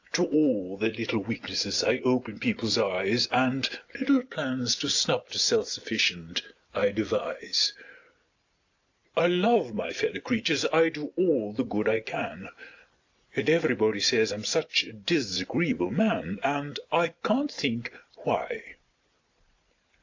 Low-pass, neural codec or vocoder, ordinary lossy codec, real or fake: 7.2 kHz; none; AAC, 48 kbps; real